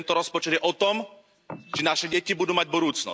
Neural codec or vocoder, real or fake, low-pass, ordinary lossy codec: none; real; none; none